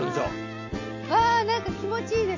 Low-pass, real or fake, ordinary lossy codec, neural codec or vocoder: 7.2 kHz; real; MP3, 32 kbps; none